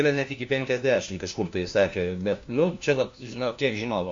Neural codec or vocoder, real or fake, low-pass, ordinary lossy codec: codec, 16 kHz, 1 kbps, FunCodec, trained on LibriTTS, 50 frames a second; fake; 7.2 kHz; MP3, 48 kbps